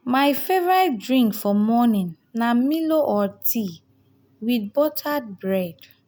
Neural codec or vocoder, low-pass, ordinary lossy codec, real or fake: none; none; none; real